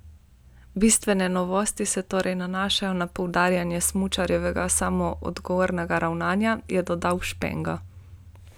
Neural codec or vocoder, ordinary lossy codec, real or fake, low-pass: none; none; real; none